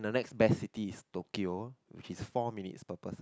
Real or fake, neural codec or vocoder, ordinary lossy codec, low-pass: real; none; none; none